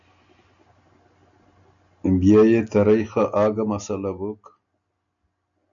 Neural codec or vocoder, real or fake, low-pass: none; real; 7.2 kHz